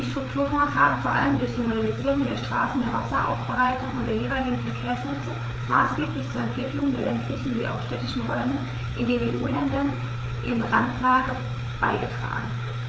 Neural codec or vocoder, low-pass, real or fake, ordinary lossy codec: codec, 16 kHz, 4 kbps, FreqCodec, larger model; none; fake; none